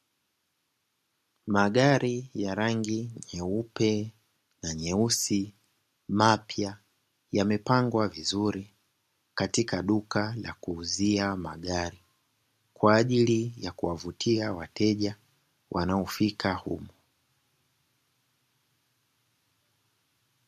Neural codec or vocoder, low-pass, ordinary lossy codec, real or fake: none; 14.4 kHz; MP3, 64 kbps; real